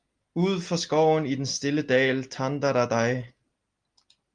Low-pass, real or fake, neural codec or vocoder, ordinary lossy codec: 9.9 kHz; real; none; Opus, 32 kbps